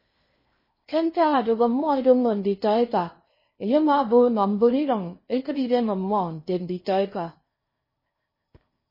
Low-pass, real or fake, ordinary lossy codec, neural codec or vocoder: 5.4 kHz; fake; MP3, 24 kbps; codec, 16 kHz in and 24 kHz out, 0.8 kbps, FocalCodec, streaming, 65536 codes